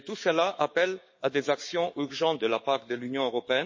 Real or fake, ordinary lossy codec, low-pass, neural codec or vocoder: fake; MP3, 32 kbps; 7.2 kHz; codec, 16 kHz, 6 kbps, DAC